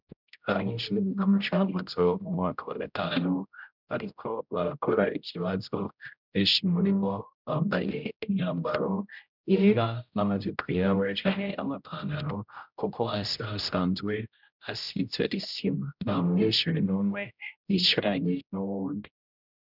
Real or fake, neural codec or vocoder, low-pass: fake; codec, 16 kHz, 0.5 kbps, X-Codec, HuBERT features, trained on general audio; 5.4 kHz